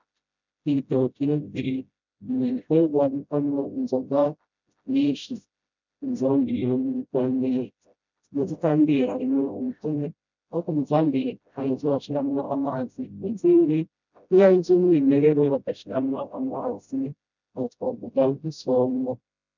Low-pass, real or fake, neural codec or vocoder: 7.2 kHz; fake; codec, 16 kHz, 0.5 kbps, FreqCodec, smaller model